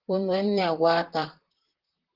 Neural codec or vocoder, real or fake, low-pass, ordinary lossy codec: vocoder, 44.1 kHz, 128 mel bands, Pupu-Vocoder; fake; 5.4 kHz; Opus, 16 kbps